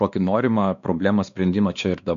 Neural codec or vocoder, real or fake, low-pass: codec, 16 kHz, 2 kbps, X-Codec, WavLM features, trained on Multilingual LibriSpeech; fake; 7.2 kHz